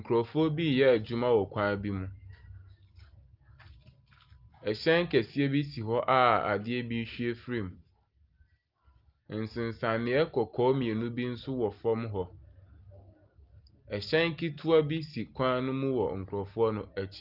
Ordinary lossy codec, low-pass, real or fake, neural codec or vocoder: Opus, 32 kbps; 5.4 kHz; real; none